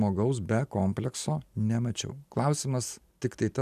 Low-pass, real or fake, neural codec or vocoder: 14.4 kHz; real; none